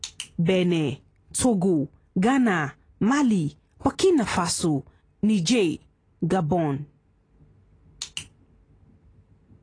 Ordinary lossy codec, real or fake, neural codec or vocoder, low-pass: AAC, 32 kbps; real; none; 9.9 kHz